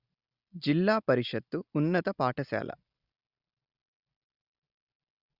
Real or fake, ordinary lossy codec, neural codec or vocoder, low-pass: real; Opus, 64 kbps; none; 5.4 kHz